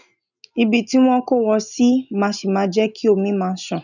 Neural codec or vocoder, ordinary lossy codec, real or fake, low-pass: none; none; real; 7.2 kHz